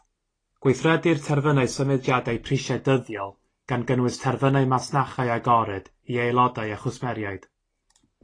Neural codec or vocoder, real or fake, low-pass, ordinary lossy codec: none; real; 9.9 kHz; AAC, 32 kbps